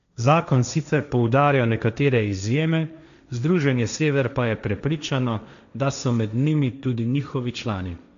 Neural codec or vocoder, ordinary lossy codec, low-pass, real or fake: codec, 16 kHz, 1.1 kbps, Voila-Tokenizer; MP3, 96 kbps; 7.2 kHz; fake